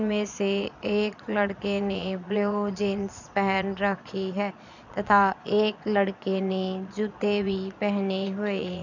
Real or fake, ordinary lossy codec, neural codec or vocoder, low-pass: fake; none; vocoder, 22.05 kHz, 80 mel bands, Vocos; 7.2 kHz